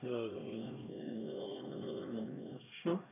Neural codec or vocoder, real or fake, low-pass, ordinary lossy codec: codec, 16 kHz, 1 kbps, X-Codec, HuBERT features, trained on LibriSpeech; fake; 3.6 kHz; none